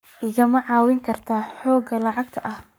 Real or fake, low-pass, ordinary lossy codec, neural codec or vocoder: fake; none; none; codec, 44.1 kHz, 7.8 kbps, Pupu-Codec